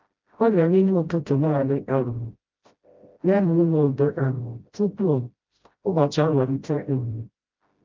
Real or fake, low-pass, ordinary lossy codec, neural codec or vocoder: fake; 7.2 kHz; Opus, 24 kbps; codec, 16 kHz, 0.5 kbps, FreqCodec, smaller model